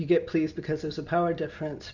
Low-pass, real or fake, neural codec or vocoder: 7.2 kHz; real; none